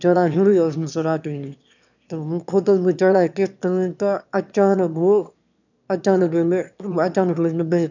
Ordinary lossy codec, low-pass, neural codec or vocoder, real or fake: none; 7.2 kHz; autoencoder, 22.05 kHz, a latent of 192 numbers a frame, VITS, trained on one speaker; fake